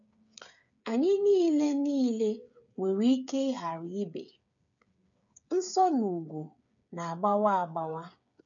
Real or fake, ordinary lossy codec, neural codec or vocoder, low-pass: fake; none; codec, 16 kHz, 8 kbps, FreqCodec, smaller model; 7.2 kHz